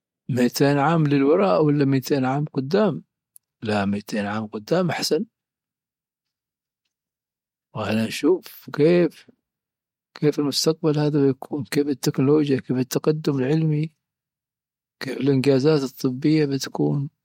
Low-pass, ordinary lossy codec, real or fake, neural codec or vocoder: 19.8 kHz; MP3, 64 kbps; real; none